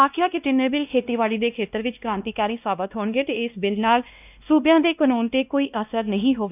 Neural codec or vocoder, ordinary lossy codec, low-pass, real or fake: codec, 16 kHz, 1 kbps, X-Codec, WavLM features, trained on Multilingual LibriSpeech; none; 3.6 kHz; fake